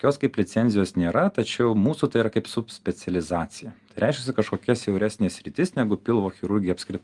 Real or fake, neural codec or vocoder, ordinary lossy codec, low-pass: real; none; Opus, 32 kbps; 10.8 kHz